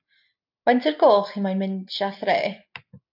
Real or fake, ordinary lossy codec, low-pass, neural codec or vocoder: real; AAC, 48 kbps; 5.4 kHz; none